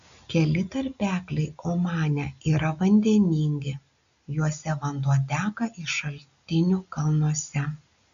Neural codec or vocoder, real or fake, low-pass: none; real; 7.2 kHz